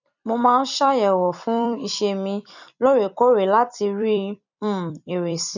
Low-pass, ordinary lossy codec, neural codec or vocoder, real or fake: 7.2 kHz; none; vocoder, 44.1 kHz, 128 mel bands every 256 samples, BigVGAN v2; fake